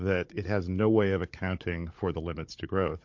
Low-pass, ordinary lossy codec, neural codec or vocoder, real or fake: 7.2 kHz; MP3, 48 kbps; codec, 16 kHz, 16 kbps, FunCodec, trained on Chinese and English, 50 frames a second; fake